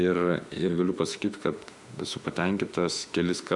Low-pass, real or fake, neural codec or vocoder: 10.8 kHz; fake; autoencoder, 48 kHz, 32 numbers a frame, DAC-VAE, trained on Japanese speech